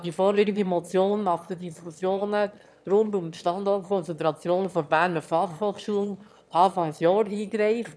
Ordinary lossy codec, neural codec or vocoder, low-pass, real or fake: none; autoencoder, 22.05 kHz, a latent of 192 numbers a frame, VITS, trained on one speaker; none; fake